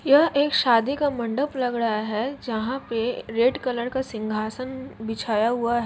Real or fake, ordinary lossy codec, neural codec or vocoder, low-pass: real; none; none; none